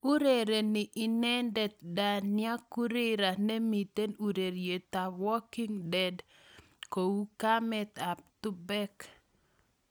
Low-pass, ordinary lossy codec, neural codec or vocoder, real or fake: none; none; none; real